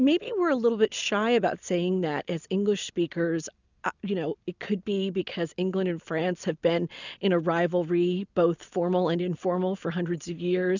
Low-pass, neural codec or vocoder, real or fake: 7.2 kHz; none; real